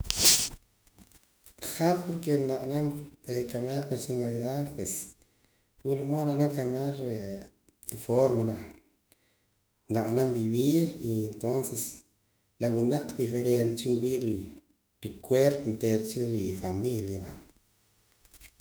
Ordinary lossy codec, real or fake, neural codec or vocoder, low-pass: none; fake; autoencoder, 48 kHz, 32 numbers a frame, DAC-VAE, trained on Japanese speech; none